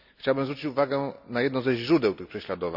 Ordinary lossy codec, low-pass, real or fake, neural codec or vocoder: none; 5.4 kHz; real; none